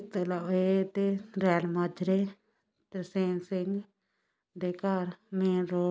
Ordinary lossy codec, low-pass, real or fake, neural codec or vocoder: none; none; real; none